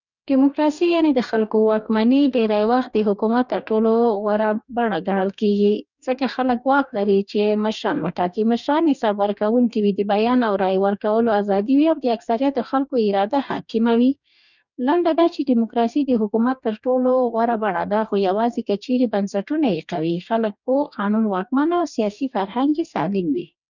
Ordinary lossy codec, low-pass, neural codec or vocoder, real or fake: none; 7.2 kHz; codec, 44.1 kHz, 2.6 kbps, DAC; fake